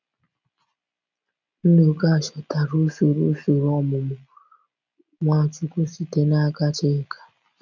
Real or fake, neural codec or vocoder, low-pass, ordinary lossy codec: real; none; 7.2 kHz; none